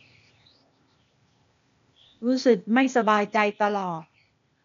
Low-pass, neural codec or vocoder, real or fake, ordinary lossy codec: 7.2 kHz; codec, 16 kHz, 0.8 kbps, ZipCodec; fake; MP3, 64 kbps